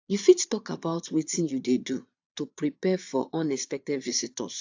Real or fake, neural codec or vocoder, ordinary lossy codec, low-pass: fake; vocoder, 22.05 kHz, 80 mel bands, Vocos; AAC, 48 kbps; 7.2 kHz